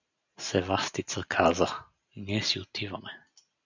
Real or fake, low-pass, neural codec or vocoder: real; 7.2 kHz; none